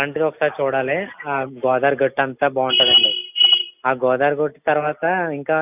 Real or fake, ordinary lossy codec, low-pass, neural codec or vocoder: real; none; 3.6 kHz; none